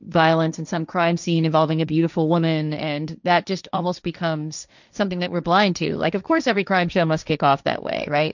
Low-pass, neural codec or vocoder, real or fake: 7.2 kHz; codec, 16 kHz, 1.1 kbps, Voila-Tokenizer; fake